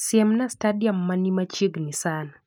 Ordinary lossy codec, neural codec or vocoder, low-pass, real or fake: none; none; none; real